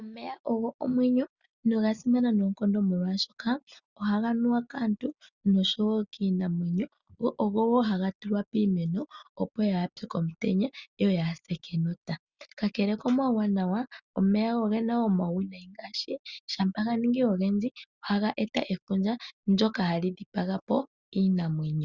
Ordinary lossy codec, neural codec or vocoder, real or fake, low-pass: Opus, 64 kbps; none; real; 7.2 kHz